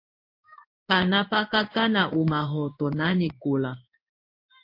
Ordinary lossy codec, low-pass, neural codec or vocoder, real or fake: MP3, 32 kbps; 5.4 kHz; codec, 16 kHz in and 24 kHz out, 1 kbps, XY-Tokenizer; fake